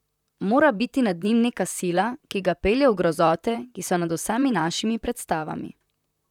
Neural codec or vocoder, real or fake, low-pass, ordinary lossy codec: vocoder, 44.1 kHz, 128 mel bands, Pupu-Vocoder; fake; 19.8 kHz; none